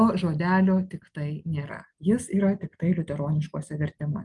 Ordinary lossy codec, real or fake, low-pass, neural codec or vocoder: Opus, 32 kbps; real; 10.8 kHz; none